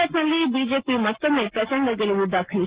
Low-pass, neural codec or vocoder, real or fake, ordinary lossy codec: 3.6 kHz; none; real; Opus, 16 kbps